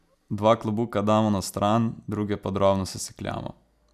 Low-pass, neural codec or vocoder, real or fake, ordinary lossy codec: 14.4 kHz; none; real; none